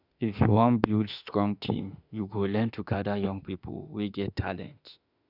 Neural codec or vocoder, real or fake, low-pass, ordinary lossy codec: autoencoder, 48 kHz, 32 numbers a frame, DAC-VAE, trained on Japanese speech; fake; 5.4 kHz; none